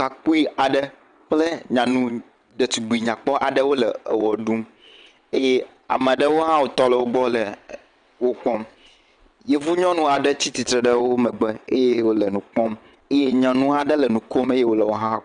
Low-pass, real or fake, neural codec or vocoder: 9.9 kHz; fake; vocoder, 22.05 kHz, 80 mel bands, WaveNeXt